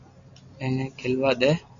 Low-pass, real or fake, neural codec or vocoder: 7.2 kHz; real; none